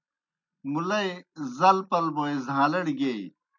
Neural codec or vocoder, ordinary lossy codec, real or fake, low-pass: none; MP3, 64 kbps; real; 7.2 kHz